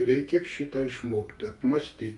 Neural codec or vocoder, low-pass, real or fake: codec, 32 kHz, 1.9 kbps, SNAC; 10.8 kHz; fake